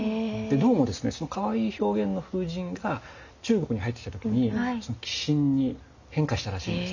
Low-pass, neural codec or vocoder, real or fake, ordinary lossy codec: 7.2 kHz; none; real; none